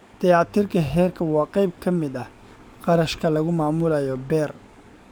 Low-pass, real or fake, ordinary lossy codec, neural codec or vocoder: none; fake; none; codec, 44.1 kHz, 7.8 kbps, DAC